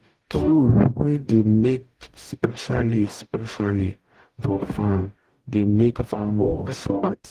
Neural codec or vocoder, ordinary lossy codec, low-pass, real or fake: codec, 44.1 kHz, 0.9 kbps, DAC; Opus, 24 kbps; 14.4 kHz; fake